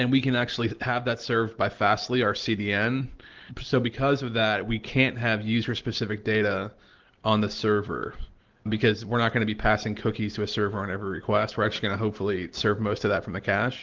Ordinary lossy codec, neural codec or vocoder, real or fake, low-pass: Opus, 16 kbps; none; real; 7.2 kHz